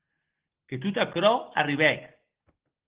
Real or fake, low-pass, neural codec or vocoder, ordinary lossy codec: real; 3.6 kHz; none; Opus, 16 kbps